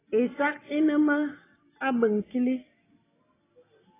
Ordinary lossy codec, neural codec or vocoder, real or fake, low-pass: AAC, 16 kbps; none; real; 3.6 kHz